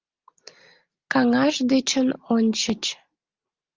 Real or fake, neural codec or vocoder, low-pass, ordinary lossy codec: real; none; 7.2 kHz; Opus, 32 kbps